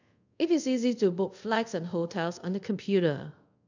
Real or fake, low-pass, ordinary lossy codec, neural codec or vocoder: fake; 7.2 kHz; none; codec, 24 kHz, 0.5 kbps, DualCodec